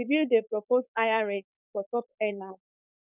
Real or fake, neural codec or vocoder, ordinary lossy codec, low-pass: fake; codec, 16 kHz, 4.8 kbps, FACodec; none; 3.6 kHz